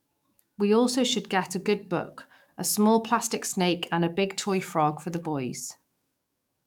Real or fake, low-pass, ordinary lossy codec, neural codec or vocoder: fake; 19.8 kHz; none; codec, 44.1 kHz, 7.8 kbps, DAC